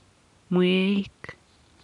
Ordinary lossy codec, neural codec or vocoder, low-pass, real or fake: none; none; 10.8 kHz; real